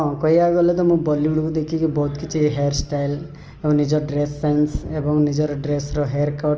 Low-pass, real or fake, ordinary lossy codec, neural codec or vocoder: 7.2 kHz; real; Opus, 24 kbps; none